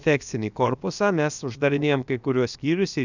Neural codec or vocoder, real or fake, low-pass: codec, 16 kHz, about 1 kbps, DyCAST, with the encoder's durations; fake; 7.2 kHz